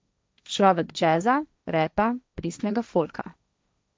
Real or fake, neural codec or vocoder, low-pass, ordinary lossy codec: fake; codec, 16 kHz, 1.1 kbps, Voila-Tokenizer; none; none